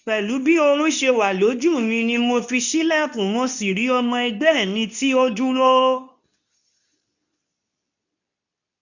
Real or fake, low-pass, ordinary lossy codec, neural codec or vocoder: fake; 7.2 kHz; none; codec, 24 kHz, 0.9 kbps, WavTokenizer, medium speech release version 2